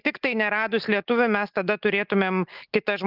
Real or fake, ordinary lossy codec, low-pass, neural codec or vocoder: real; Opus, 24 kbps; 5.4 kHz; none